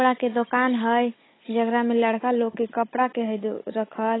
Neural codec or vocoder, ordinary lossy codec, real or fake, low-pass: codec, 16 kHz, 16 kbps, FunCodec, trained on Chinese and English, 50 frames a second; AAC, 16 kbps; fake; 7.2 kHz